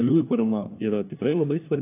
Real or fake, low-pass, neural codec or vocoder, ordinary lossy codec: fake; 3.6 kHz; codec, 16 kHz, 1 kbps, FunCodec, trained on LibriTTS, 50 frames a second; AAC, 24 kbps